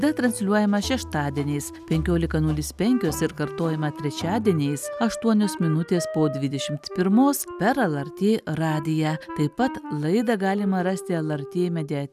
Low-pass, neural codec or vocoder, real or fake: 14.4 kHz; none; real